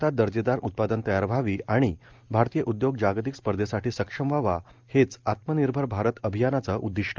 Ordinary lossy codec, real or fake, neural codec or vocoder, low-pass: Opus, 32 kbps; real; none; 7.2 kHz